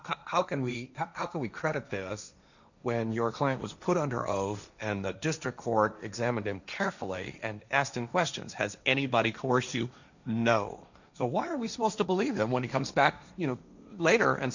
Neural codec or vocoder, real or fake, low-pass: codec, 16 kHz, 1.1 kbps, Voila-Tokenizer; fake; 7.2 kHz